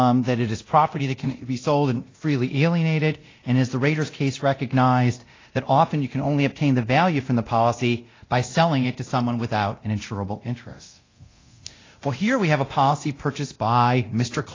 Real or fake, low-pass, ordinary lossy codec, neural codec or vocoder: fake; 7.2 kHz; AAC, 32 kbps; codec, 24 kHz, 0.9 kbps, DualCodec